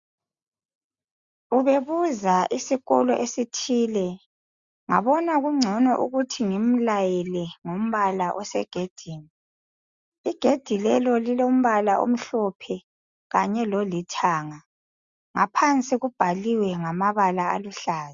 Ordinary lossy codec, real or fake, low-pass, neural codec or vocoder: Opus, 64 kbps; real; 7.2 kHz; none